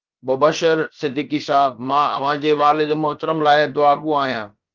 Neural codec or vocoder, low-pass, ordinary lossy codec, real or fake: codec, 16 kHz, about 1 kbps, DyCAST, with the encoder's durations; 7.2 kHz; Opus, 32 kbps; fake